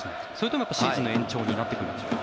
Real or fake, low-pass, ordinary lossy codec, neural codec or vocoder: real; none; none; none